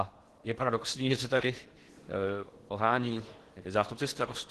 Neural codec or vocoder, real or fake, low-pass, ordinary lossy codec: codec, 16 kHz in and 24 kHz out, 0.8 kbps, FocalCodec, streaming, 65536 codes; fake; 10.8 kHz; Opus, 16 kbps